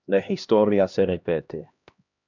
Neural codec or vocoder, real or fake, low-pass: codec, 16 kHz, 1 kbps, X-Codec, HuBERT features, trained on LibriSpeech; fake; 7.2 kHz